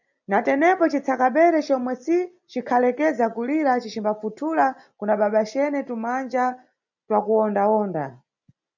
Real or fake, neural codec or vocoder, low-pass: real; none; 7.2 kHz